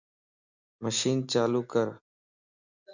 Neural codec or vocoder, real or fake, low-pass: none; real; 7.2 kHz